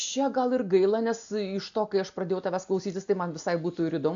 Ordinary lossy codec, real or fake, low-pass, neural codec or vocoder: AAC, 64 kbps; real; 7.2 kHz; none